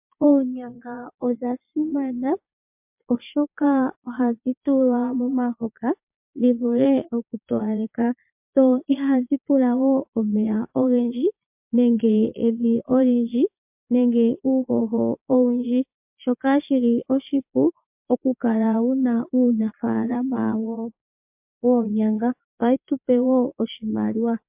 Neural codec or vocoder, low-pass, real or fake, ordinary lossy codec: vocoder, 22.05 kHz, 80 mel bands, Vocos; 3.6 kHz; fake; MP3, 32 kbps